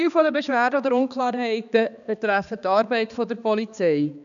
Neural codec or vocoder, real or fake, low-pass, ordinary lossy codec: codec, 16 kHz, 2 kbps, X-Codec, HuBERT features, trained on balanced general audio; fake; 7.2 kHz; none